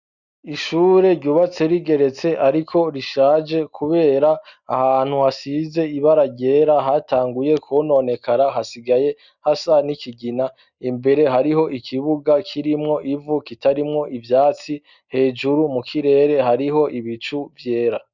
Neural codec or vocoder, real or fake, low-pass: none; real; 7.2 kHz